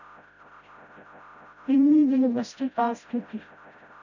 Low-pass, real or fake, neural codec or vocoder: 7.2 kHz; fake; codec, 16 kHz, 0.5 kbps, FreqCodec, smaller model